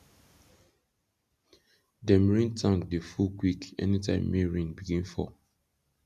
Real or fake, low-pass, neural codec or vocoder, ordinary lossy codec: real; 14.4 kHz; none; none